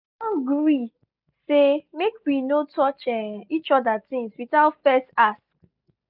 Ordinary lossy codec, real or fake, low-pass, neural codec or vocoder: none; real; 5.4 kHz; none